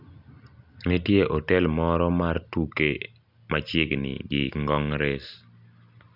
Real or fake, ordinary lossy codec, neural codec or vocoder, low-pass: real; none; none; 5.4 kHz